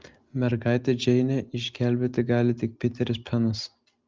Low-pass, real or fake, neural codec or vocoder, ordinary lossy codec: 7.2 kHz; real; none; Opus, 32 kbps